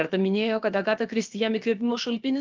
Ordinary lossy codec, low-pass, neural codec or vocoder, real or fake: Opus, 24 kbps; 7.2 kHz; codec, 16 kHz, about 1 kbps, DyCAST, with the encoder's durations; fake